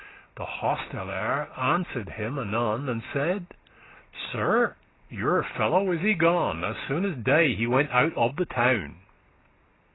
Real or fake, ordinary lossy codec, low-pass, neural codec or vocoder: real; AAC, 16 kbps; 7.2 kHz; none